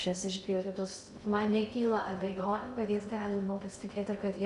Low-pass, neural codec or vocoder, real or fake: 10.8 kHz; codec, 16 kHz in and 24 kHz out, 0.6 kbps, FocalCodec, streaming, 2048 codes; fake